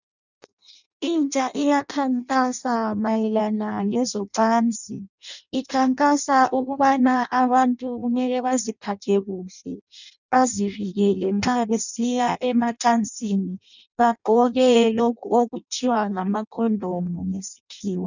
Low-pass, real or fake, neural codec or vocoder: 7.2 kHz; fake; codec, 16 kHz in and 24 kHz out, 0.6 kbps, FireRedTTS-2 codec